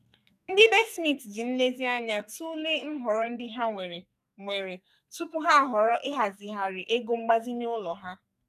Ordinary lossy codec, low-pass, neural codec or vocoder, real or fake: none; 14.4 kHz; codec, 44.1 kHz, 2.6 kbps, SNAC; fake